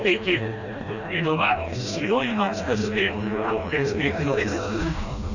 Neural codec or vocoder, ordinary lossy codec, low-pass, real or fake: codec, 16 kHz, 1 kbps, FreqCodec, smaller model; none; 7.2 kHz; fake